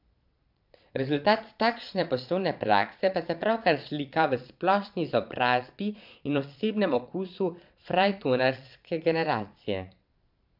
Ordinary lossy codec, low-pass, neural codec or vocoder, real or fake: MP3, 48 kbps; 5.4 kHz; vocoder, 22.05 kHz, 80 mel bands, Vocos; fake